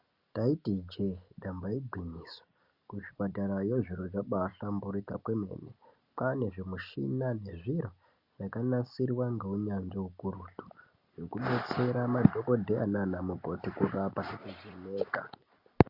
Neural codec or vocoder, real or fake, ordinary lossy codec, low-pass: none; real; Opus, 64 kbps; 5.4 kHz